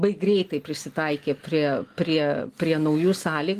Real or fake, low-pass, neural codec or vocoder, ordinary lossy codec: real; 14.4 kHz; none; Opus, 24 kbps